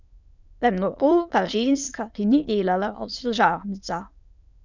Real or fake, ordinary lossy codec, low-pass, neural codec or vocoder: fake; none; 7.2 kHz; autoencoder, 22.05 kHz, a latent of 192 numbers a frame, VITS, trained on many speakers